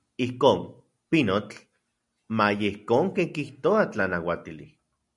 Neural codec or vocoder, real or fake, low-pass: none; real; 10.8 kHz